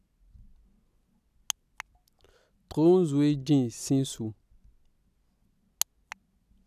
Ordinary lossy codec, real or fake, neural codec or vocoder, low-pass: none; real; none; 14.4 kHz